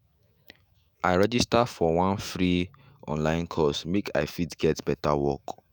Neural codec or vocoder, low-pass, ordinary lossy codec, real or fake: autoencoder, 48 kHz, 128 numbers a frame, DAC-VAE, trained on Japanese speech; none; none; fake